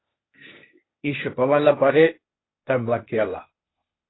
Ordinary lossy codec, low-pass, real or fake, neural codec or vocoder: AAC, 16 kbps; 7.2 kHz; fake; codec, 16 kHz, 0.8 kbps, ZipCodec